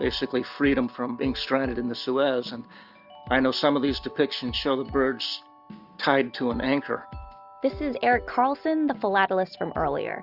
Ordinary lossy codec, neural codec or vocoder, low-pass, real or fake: Opus, 64 kbps; none; 5.4 kHz; real